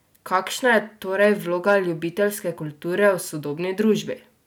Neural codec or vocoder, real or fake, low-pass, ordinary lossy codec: none; real; none; none